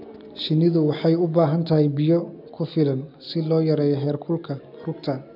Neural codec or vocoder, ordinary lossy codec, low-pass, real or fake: none; none; 5.4 kHz; real